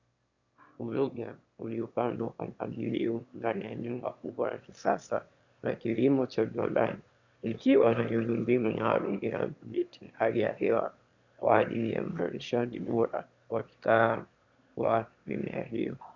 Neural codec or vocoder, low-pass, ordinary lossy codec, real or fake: autoencoder, 22.05 kHz, a latent of 192 numbers a frame, VITS, trained on one speaker; 7.2 kHz; Opus, 64 kbps; fake